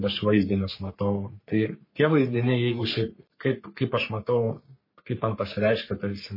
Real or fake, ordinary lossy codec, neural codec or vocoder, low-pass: fake; MP3, 24 kbps; codec, 44.1 kHz, 3.4 kbps, Pupu-Codec; 5.4 kHz